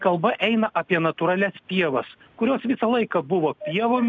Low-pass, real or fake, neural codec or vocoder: 7.2 kHz; real; none